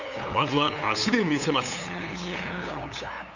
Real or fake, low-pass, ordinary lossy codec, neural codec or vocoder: fake; 7.2 kHz; none; codec, 16 kHz, 8 kbps, FunCodec, trained on LibriTTS, 25 frames a second